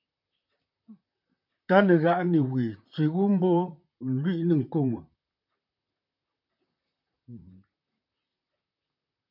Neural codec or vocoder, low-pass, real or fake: vocoder, 44.1 kHz, 128 mel bands, Pupu-Vocoder; 5.4 kHz; fake